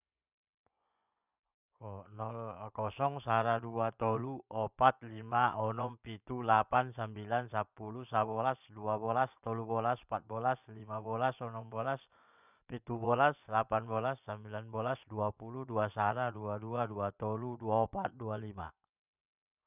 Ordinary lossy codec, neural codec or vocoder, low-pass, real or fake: none; vocoder, 24 kHz, 100 mel bands, Vocos; 3.6 kHz; fake